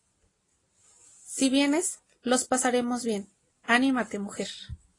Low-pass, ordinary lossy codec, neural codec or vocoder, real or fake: 10.8 kHz; AAC, 32 kbps; none; real